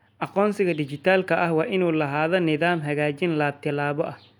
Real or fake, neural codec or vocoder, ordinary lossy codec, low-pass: real; none; none; 19.8 kHz